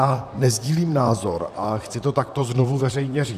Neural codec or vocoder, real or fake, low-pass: vocoder, 44.1 kHz, 128 mel bands, Pupu-Vocoder; fake; 14.4 kHz